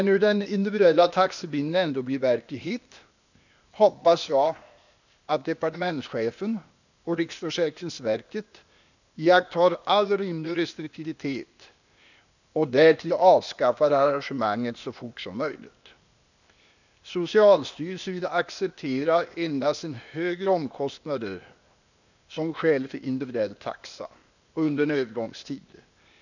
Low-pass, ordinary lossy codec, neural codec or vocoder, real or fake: 7.2 kHz; none; codec, 16 kHz, 0.8 kbps, ZipCodec; fake